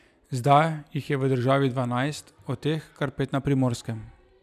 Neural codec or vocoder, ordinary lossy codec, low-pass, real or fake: none; none; 14.4 kHz; real